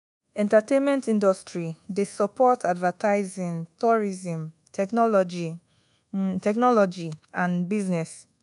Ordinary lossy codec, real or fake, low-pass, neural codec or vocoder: none; fake; 10.8 kHz; codec, 24 kHz, 1.2 kbps, DualCodec